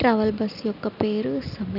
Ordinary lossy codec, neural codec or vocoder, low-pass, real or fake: none; none; 5.4 kHz; real